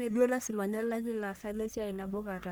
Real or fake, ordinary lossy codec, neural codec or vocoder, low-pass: fake; none; codec, 44.1 kHz, 1.7 kbps, Pupu-Codec; none